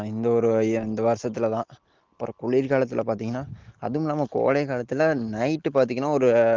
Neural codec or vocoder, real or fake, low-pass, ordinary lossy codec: none; real; 7.2 kHz; Opus, 16 kbps